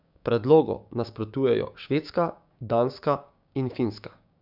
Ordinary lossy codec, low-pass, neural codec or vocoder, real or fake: none; 5.4 kHz; codec, 16 kHz, 6 kbps, DAC; fake